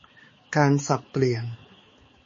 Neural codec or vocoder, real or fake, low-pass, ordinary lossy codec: codec, 16 kHz, 4 kbps, X-Codec, HuBERT features, trained on balanced general audio; fake; 7.2 kHz; MP3, 32 kbps